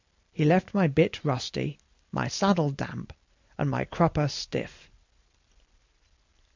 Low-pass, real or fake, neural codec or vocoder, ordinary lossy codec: 7.2 kHz; real; none; MP3, 64 kbps